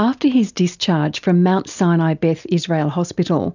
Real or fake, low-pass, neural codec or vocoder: real; 7.2 kHz; none